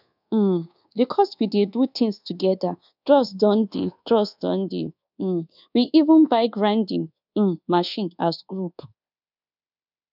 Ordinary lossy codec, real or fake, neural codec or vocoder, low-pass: none; fake; codec, 24 kHz, 1.2 kbps, DualCodec; 5.4 kHz